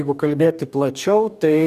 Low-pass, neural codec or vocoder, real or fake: 14.4 kHz; codec, 44.1 kHz, 2.6 kbps, DAC; fake